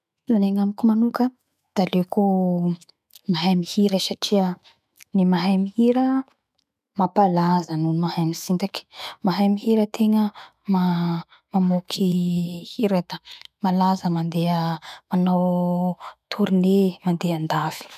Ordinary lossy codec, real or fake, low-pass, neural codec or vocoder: MP3, 96 kbps; fake; 14.4 kHz; autoencoder, 48 kHz, 128 numbers a frame, DAC-VAE, trained on Japanese speech